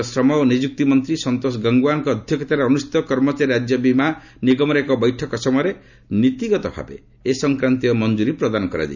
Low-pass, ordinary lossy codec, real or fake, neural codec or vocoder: 7.2 kHz; none; real; none